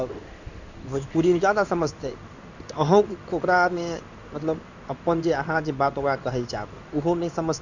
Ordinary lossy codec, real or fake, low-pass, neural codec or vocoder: none; fake; 7.2 kHz; codec, 16 kHz in and 24 kHz out, 1 kbps, XY-Tokenizer